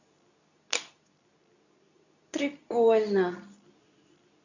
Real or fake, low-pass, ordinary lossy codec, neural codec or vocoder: fake; 7.2 kHz; none; codec, 24 kHz, 0.9 kbps, WavTokenizer, medium speech release version 2